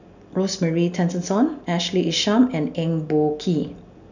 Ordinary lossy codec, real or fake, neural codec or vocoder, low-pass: none; real; none; 7.2 kHz